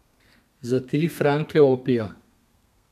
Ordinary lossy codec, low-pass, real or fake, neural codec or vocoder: none; 14.4 kHz; fake; codec, 32 kHz, 1.9 kbps, SNAC